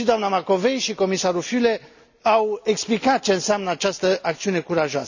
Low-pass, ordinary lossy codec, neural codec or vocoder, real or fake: 7.2 kHz; none; none; real